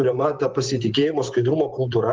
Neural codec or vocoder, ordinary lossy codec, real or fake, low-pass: vocoder, 24 kHz, 100 mel bands, Vocos; Opus, 16 kbps; fake; 7.2 kHz